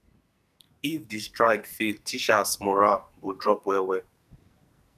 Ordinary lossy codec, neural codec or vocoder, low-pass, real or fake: none; codec, 44.1 kHz, 2.6 kbps, SNAC; 14.4 kHz; fake